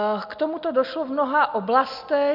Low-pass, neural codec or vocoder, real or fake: 5.4 kHz; none; real